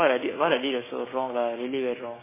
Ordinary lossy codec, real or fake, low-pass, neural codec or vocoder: MP3, 16 kbps; fake; 3.6 kHz; codec, 16 kHz, 6 kbps, DAC